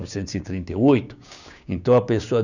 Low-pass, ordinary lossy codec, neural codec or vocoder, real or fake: 7.2 kHz; none; codec, 16 kHz, 6 kbps, DAC; fake